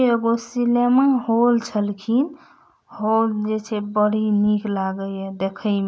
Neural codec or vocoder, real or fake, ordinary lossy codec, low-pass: none; real; none; none